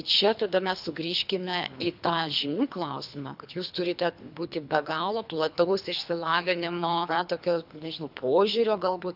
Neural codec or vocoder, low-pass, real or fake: codec, 24 kHz, 3 kbps, HILCodec; 5.4 kHz; fake